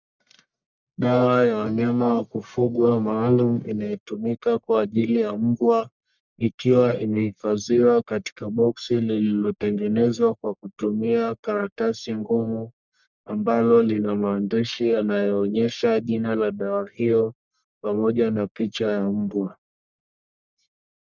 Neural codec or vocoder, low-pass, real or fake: codec, 44.1 kHz, 1.7 kbps, Pupu-Codec; 7.2 kHz; fake